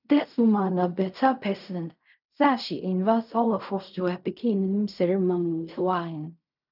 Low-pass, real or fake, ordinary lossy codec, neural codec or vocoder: 5.4 kHz; fake; none; codec, 16 kHz in and 24 kHz out, 0.4 kbps, LongCat-Audio-Codec, fine tuned four codebook decoder